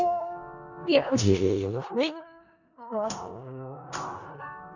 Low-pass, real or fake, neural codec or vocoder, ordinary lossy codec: 7.2 kHz; fake; codec, 16 kHz in and 24 kHz out, 0.4 kbps, LongCat-Audio-Codec, four codebook decoder; none